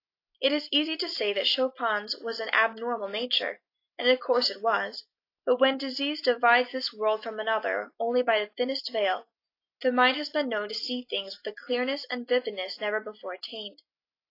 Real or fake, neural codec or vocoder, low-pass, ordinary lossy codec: real; none; 5.4 kHz; AAC, 32 kbps